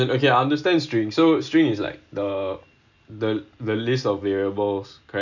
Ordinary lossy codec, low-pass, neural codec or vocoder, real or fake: none; 7.2 kHz; none; real